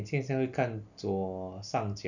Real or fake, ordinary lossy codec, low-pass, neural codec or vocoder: real; none; 7.2 kHz; none